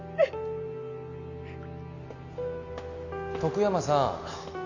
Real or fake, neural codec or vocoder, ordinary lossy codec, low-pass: real; none; none; 7.2 kHz